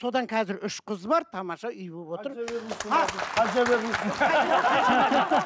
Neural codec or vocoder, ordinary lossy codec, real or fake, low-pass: none; none; real; none